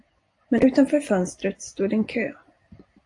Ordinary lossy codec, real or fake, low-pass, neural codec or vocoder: AAC, 48 kbps; real; 10.8 kHz; none